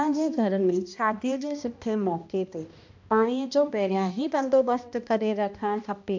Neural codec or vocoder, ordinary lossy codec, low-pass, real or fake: codec, 16 kHz, 1 kbps, X-Codec, HuBERT features, trained on balanced general audio; MP3, 64 kbps; 7.2 kHz; fake